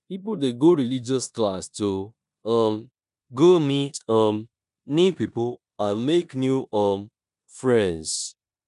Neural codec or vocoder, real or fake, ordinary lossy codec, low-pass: codec, 16 kHz in and 24 kHz out, 0.9 kbps, LongCat-Audio-Codec, four codebook decoder; fake; none; 10.8 kHz